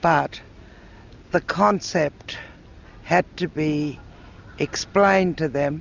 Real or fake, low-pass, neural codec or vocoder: real; 7.2 kHz; none